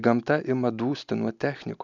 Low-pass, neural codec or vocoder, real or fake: 7.2 kHz; none; real